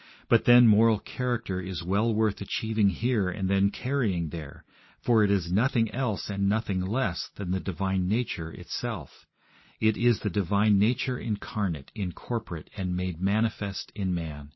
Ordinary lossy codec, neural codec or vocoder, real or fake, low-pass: MP3, 24 kbps; none; real; 7.2 kHz